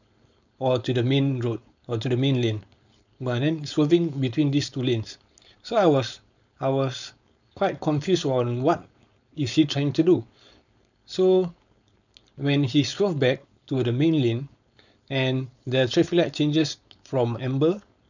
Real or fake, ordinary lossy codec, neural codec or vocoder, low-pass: fake; none; codec, 16 kHz, 4.8 kbps, FACodec; 7.2 kHz